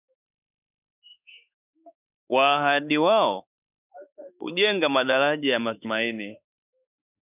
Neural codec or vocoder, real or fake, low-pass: autoencoder, 48 kHz, 32 numbers a frame, DAC-VAE, trained on Japanese speech; fake; 3.6 kHz